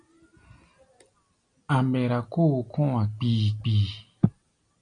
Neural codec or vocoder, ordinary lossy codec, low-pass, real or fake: none; MP3, 96 kbps; 9.9 kHz; real